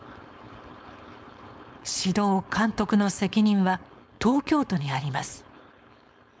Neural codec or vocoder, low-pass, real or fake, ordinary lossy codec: codec, 16 kHz, 4.8 kbps, FACodec; none; fake; none